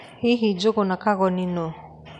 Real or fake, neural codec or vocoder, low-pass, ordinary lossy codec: real; none; 10.8 kHz; none